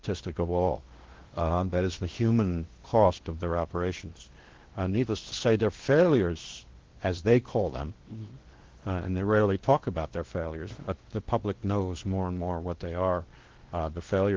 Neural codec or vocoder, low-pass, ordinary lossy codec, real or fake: codec, 16 kHz in and 24 kHz out, 0.8 kbps, FocalCodec, streaming, 65536 codes; 7.2 kHz; Opus, 16 kbps; fake